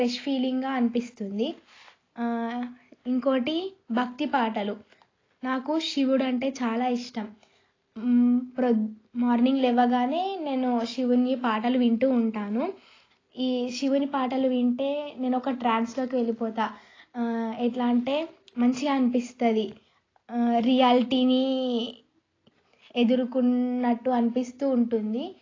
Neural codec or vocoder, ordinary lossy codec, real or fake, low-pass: none; AAC, 32 kbps; real; 7.2 kHz